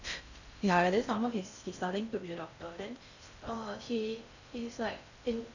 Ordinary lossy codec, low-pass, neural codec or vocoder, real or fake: none; 7.2 kHz; codec, 16 kHz in and 24 kHz out, 0.6 kbps, FocalCodec, streaming, 4096 codes; fake